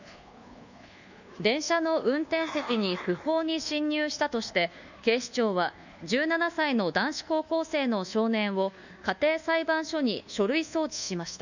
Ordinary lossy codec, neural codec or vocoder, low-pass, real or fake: none; codec, 24 kHz, 1.2 kbps, DualCodec; 7.2 kHz; fake